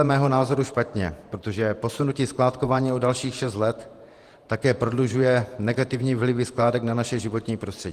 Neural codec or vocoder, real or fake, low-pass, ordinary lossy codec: vocoder, 48 kHz, 128 mel bands, Vocos; fake; 14.4 kHz; Opus, 24 kbps